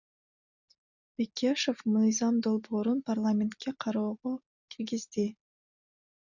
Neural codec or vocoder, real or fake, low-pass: none; real; 7.2 kHz